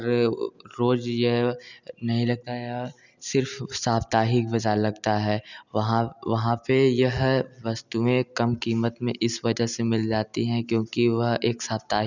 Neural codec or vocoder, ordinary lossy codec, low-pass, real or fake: none; none; 7.2 kHz; real